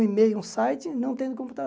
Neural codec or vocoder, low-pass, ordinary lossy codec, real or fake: none; none; none; real